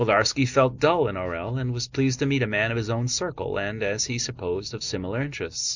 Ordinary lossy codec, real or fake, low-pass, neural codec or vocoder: Opus, 64 kbps; real; 7.2 kHz; none